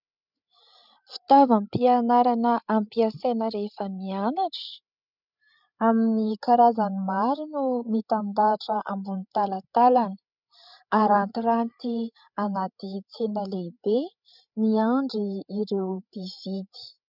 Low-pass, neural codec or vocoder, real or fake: 5.4 kHz; codec, 16 kHz, 8 kbps, FreqCodec, larger model; fake